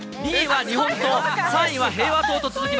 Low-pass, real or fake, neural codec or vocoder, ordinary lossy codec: none; real; none; none